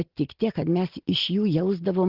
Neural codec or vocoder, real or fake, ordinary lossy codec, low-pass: none; real; Opus, 16 kbps; 5.4 kHz